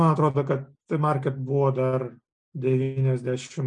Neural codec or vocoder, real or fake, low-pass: none; real; 9.9 kHz